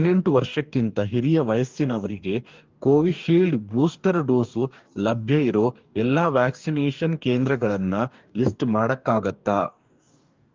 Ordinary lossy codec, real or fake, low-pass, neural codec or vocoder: Opus, 24 kbps; fake; 7.2 kHz; codec, 44.1 kHz, 2.6 kbps, DAC